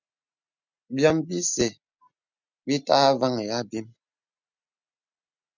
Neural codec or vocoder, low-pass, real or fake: none; 7.2 kHz; real